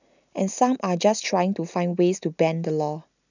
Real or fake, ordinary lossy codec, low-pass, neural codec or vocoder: real; none; 7.2 kHz; none